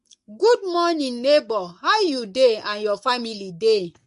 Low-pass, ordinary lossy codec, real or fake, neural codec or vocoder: 14.4 kHz; MP3, 48 kbps; fake; codec, 44.1 kHz, 7.8 kbps, Pupu-Codec